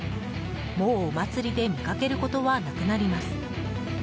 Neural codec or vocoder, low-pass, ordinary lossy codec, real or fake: none; none; none; real